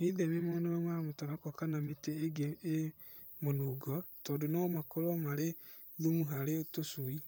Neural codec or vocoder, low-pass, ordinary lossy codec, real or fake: vocoder, 44.1 kHz, 128 mel bands, Pupu-Vocoder; none; none; fake